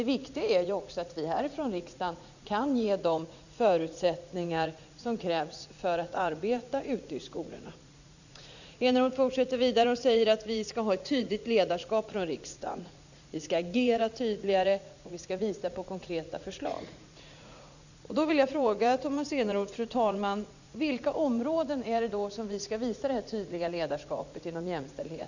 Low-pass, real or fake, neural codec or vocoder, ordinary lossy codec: 7.2 kHz; fake; vocoder, 44.1 kHz, 80 mel bands, Vocos; none